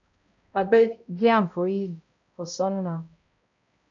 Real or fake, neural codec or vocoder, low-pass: fake; codec, 16 kHz, 0.5 kbps, X-Codec, HuBERT features, trained on balanced general audio; 7.2 kHz